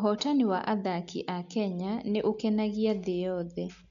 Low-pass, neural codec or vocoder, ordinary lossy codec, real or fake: 7.2 kHz; none; none; real